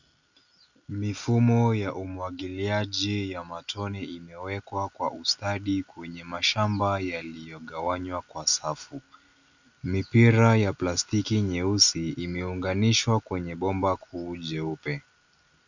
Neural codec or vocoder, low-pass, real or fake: none; 7.2 kHz; real